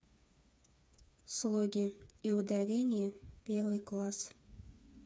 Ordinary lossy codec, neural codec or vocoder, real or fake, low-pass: none; codec, 16 kHz, 4 kbps, FreqCodec, smaller model; fake; none